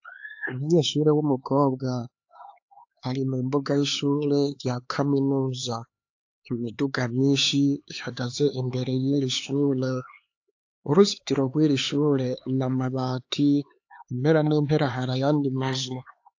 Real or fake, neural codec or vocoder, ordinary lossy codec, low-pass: fake; codec, 16 kHz, 4 kbps, X-Codec, HuBERT features, trained on LibriSpeech; AAC, 48 kbps; 7.2 kHz